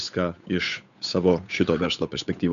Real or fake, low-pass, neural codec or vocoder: fake; 7.2 kHz; codec, 16 kHz, 8 kbps, FunCodec, trained on Chinese and English, 25 frames a second